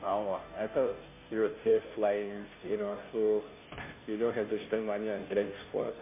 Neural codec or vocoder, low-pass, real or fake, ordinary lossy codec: codec, 16 kHz, 0.5 kbps, FunCodec, trained on Chinese and English, 25 frames a second; 3.6 kHz; fake; MP3, 24 kbps